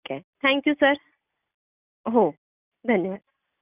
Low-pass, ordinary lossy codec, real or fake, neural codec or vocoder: 3.6 kHz; none; real; none